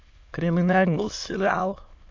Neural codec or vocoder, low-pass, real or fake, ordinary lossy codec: autoencoder, 22.05 kHz, a latent of 192 numbers a frame, VITS, trained on many speakers; 7.2 kHz; fake; MP3, 48 kbps